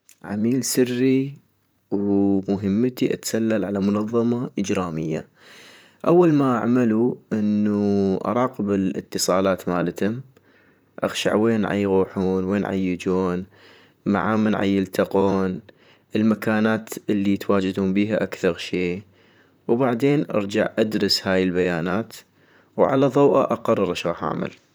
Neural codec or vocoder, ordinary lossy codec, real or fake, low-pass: vocoder, 44.1 kHz, 128 mel bands, Pupu-Vocoder; none; fake; none